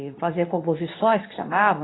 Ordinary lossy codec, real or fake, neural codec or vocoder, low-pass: AAC, 16 kbps; fake; codec, 24 kHz, 0.9 kbps, WavTokenizer, medium speech release version 2; 7.2 kHz